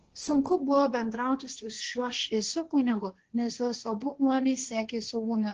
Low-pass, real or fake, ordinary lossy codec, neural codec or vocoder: 7.2 kHz; fake; Opus, 16 kbps; codec, 16 kHz, 1.1 kbps, Voila-Tokenizer